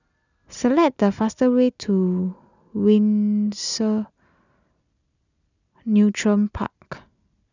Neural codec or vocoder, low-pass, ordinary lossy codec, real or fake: none; 7.2 kHz; none; real